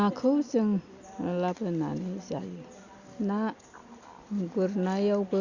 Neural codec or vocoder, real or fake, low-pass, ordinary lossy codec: none; real; 7.2 kHz; Opus, 64 kbps